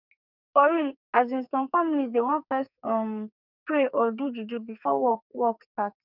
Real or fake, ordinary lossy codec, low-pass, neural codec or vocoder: fake; none; 5.4 kHz; codec, 44.1 kHz, 2.6 kbps, SNAC